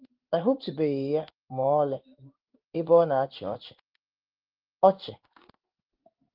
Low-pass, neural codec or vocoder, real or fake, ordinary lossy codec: 5.4 kHz; codec, 16 kHz in and 24 kHz out, 1 kbps, XY-Tokenizer; fake; Opus, 32 kbps